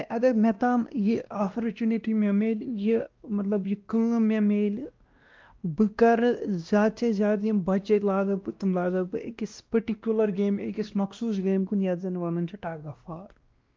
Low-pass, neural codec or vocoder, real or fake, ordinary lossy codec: 7.2 kHz; codec, 16 kHz, 1 kbps, X-Codec, WavLM features, trained on Multilingual LibriSpeech; fake; Opus, 24 kbps